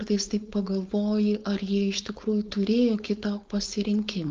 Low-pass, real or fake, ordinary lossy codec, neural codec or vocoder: 7.2 kHz; fake; Opus, 24 kbps; codec, 16 kHz, 4.8 kbps, FACodec